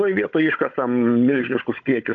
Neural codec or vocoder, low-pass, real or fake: codec, 16 kHz, 16 kbps, FunCodec, trained on Chinese and English, 50 frames a second; 7.2 kHz; fake